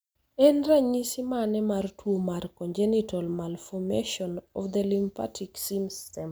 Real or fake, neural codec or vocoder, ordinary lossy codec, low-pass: real; none; none; none